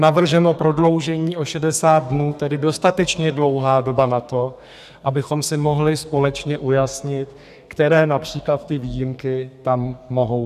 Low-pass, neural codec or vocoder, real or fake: 14.4 kHz; codec, 32 kHz, 1.9 kbps, SNAC; fake